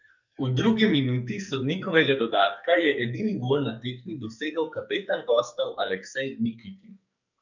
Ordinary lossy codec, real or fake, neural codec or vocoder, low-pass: none; fake; codec, 44.1 kHz, 2.6 kbps, SNAC; 7.2 kHz